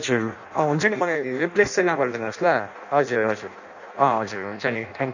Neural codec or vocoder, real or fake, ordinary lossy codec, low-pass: codec, 16 kHz in and 24 kHz out, 0.6 kbps, FireRedTTS-2 codec; fake; none; 7.2 kHz